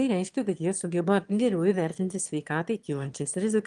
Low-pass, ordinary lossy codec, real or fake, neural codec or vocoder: 9.9 kHz; Opus, 24 kbps; fake; autoencoder, 22.05 kHz, a latent of 192 numbers a frame, VITS, trained on one speaker